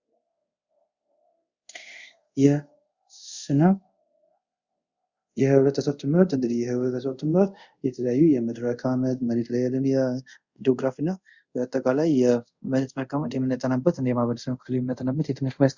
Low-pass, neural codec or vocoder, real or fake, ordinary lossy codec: 7.2 kHz; codec, 24 kHz, 0.5 kbps, DualCodec; fake; Opus, 64 kbps